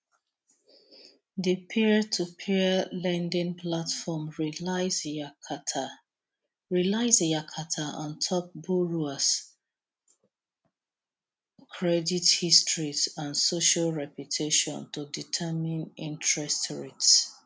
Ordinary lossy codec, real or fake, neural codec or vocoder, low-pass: none; real; none; none